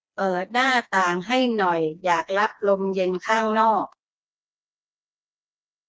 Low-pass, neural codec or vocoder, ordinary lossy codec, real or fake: none; codec, 16 kHz, 2 kbps, FreqCodec, smaller model; none; fake